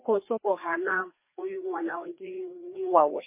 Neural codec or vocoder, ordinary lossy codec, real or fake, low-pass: codec, 16 kHz, 2 kbps, FreqCodec, larger model; MP3, 24 kbps; fake; 3.6 kHz